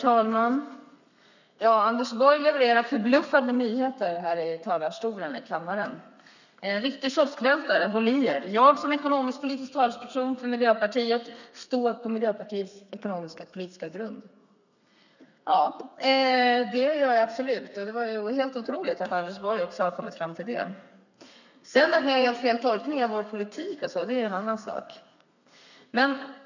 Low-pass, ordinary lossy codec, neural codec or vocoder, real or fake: 7.2 kHz; none; codec, 32 kHz, 1.9 kbps, SNAC; fake